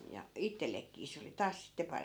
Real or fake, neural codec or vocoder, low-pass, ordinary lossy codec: real; none; none; none